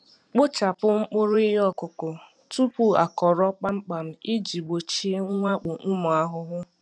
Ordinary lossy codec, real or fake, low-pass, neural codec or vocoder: none; fake; 9.9 kHz; vocoder, 44.1 kHz, 128 mel bands every 512 samples, BigVGAN v2